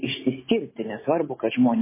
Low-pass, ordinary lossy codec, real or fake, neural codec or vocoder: 3.6 kHz; MP3, 16 kbps; real; none